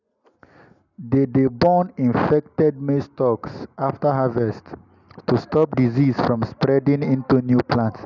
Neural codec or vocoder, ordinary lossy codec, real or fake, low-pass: none; none; real; 7.2 kHz